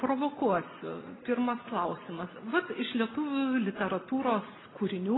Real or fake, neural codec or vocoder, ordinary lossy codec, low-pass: real; none; AAC, 16 kbps; 7.2 kHz